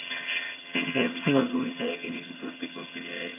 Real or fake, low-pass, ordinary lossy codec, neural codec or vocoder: fake; 3.6 kHz; none; vocoder, 22.05 kHz, 80 mel bands, HiFi-GAN